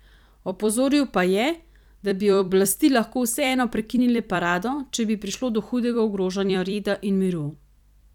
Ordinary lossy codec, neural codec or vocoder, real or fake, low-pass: none; vocoder, 44.1 kHz, 128 mel bands every 256 samples, BigVGAN v2; fake; 19.8 kHz